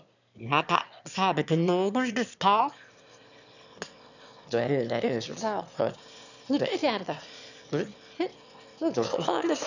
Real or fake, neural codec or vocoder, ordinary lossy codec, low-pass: fake; autoencoder, 22.05 kHz, a latent of 192 numbers a frame, VITS, trained on one speaker; none; 7.2 kHz